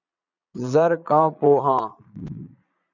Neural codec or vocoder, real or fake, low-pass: vocoder, 22.05 kHz, 80 mel bands, Vocos; fake; 7.2 kHz